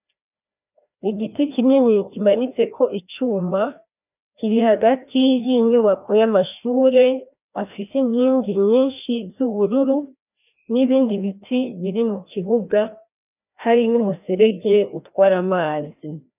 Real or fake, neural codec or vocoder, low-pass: fake; codec, 16 kHz, 1 kbps, FreqCodec, larger model; 3.6 kHz